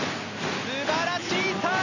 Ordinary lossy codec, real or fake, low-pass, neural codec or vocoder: none; real; 7.2 kHz; none